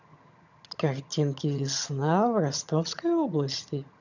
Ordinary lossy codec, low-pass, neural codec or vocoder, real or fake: none; 7.2 kHz; vocoder, 22.05 kHz, 80 mel bands, HiFi-GAN; fake